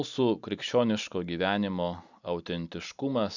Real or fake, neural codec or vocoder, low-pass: real; none; 7.2 kHz